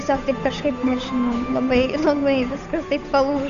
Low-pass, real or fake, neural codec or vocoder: 7.2 kHz; fake; codec, 16 kHz, 8 kbps, FunCodec, trained on Chinese and English, 25 frames a second